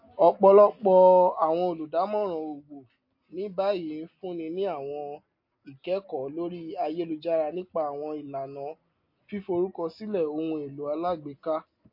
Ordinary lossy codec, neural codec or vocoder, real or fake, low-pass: MP3, 32 kbps; none; real; 5.4 kHz